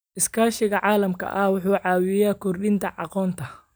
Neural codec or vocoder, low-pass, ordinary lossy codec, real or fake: none; none; none; real